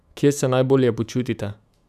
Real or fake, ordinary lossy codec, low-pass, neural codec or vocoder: fake; none; 14.4 kHz; autoencoder, 48 kHz, 128 numbers a frame, DAC-VAE, trained on Japanese speech